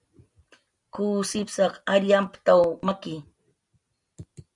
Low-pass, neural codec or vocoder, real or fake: 10.8 kHz; none; real